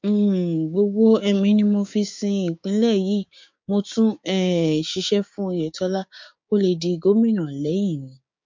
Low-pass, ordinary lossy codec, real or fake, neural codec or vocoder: 7.2 kHz; MP3, 48 kbps; fake; codec, 16 kHz, 6 kbps, DAC